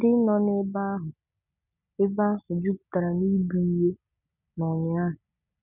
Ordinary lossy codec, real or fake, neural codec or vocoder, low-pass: none; real; none; 3.6 kHz